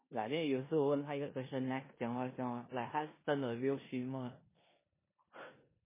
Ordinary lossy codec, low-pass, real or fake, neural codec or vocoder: MP3, 16 kbps; 3.6 kHz; fake; codec, 16 kHz in and 24 kHz out, 0.9 kbps, LongCat-Audio-Codec, four codebook decoder